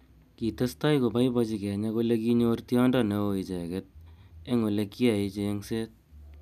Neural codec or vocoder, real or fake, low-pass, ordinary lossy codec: none; real; 14.4 kHz; none